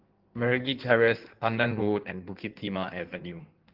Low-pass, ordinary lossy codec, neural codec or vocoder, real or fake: 5.4 kHz; Opus, 16 kbps; codec, 16 kHz in and 24 kHz out, 1.1 kbps, FireRedTTS-2 codec; fake